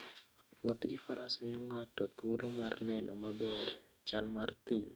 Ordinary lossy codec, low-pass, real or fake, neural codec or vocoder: none; none; fake; codec, 44.1 kHz, 2.6 kbps, DAC